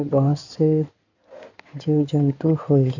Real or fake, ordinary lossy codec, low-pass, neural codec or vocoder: fake; none; 7.2 kHz; codec, 16 kHz in and 24 kHz out, 2.2 kbps, FireRedTTS-2 codec